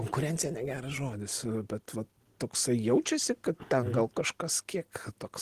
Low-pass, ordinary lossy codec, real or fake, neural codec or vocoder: 14.4 kHz; Opus, 16 kbps; real; none